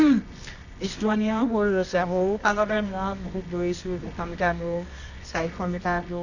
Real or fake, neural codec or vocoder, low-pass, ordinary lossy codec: fake; codec, 24 kHz, 0.9 kbps, WavTokenizer, medium music audio release; 7.2 kHz; none